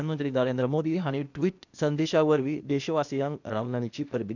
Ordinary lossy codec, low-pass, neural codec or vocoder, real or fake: none; 7.2 kHz; codec, 16 kHz, 0.8 kbps, ZipCodec; fake